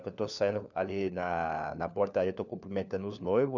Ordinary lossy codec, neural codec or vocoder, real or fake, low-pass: none; codec, 16 kHz, 4 kbps, FunCodec, trained on LibriTTS, 50 frames a second; fake; 7.2 kHz